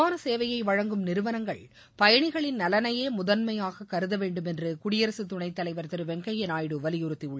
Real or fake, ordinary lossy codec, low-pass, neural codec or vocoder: real; none; none; none